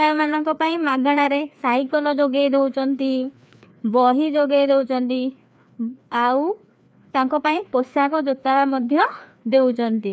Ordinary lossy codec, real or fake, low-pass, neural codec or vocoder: none; fake; none; codec, 16 kHz, 2 kbps, FreqCodec, larger model